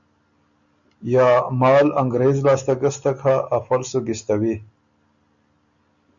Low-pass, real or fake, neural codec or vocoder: 7.2 kHz; real; none